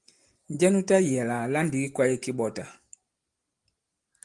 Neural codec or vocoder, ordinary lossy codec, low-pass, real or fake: none; Opus, 24 kbps; 10.8 kHz; real